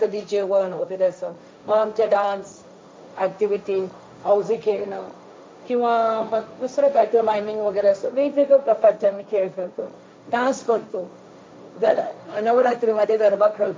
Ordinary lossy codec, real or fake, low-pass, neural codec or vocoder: none; fake; none; codec, 16 kHz, 1.1 kbps, Voila-Tokenizer